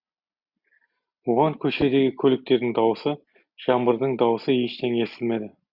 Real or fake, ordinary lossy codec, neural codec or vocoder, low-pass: real; Opus, 64 kbps; none; 5.4 kHz